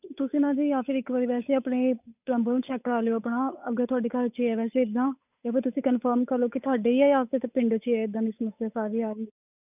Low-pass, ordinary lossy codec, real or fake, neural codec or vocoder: 3.6 kHz; none; fake; codec, 16 kHz, 8 kbps, FunCodec, trained on Chinese and English, 25 frames a second